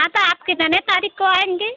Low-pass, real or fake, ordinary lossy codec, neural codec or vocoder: 7.2 kHz; real; none; none